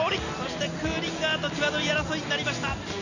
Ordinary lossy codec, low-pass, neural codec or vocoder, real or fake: none; 7.2 kHz; none; real